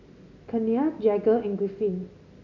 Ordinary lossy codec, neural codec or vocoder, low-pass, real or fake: none; none; 7.2 kHz; real